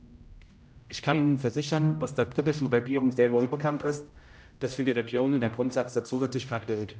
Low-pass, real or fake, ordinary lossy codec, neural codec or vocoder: none; fake; none; codec, 16 kHz, 0.5 kbps, X-Codec, HuBERT features, trained on general audio